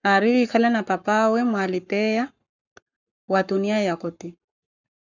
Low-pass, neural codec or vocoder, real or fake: 7.2 kHz; codec, 44.1 kHz, 7.8 kbps, Pupu-Codec; fake